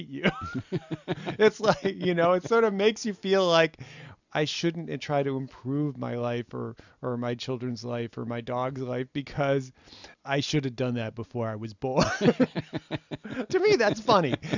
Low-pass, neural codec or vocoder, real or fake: 7.2 kHz; none; real